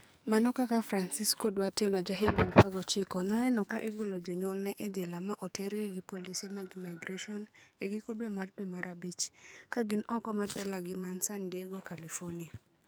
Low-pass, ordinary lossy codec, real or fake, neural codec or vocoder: none; none; fake; codec, 44.1 kHz, 2.6 kbps, SNAC